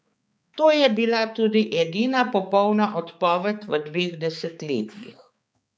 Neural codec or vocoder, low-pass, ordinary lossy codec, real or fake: codec, 16 kHz, 4 kbps, X-Codec, HuBERT features, trained on balanced general audio; none; none; fake